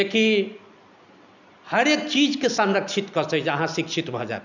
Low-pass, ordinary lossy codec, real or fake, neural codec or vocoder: 7.2 kHz; none; real; none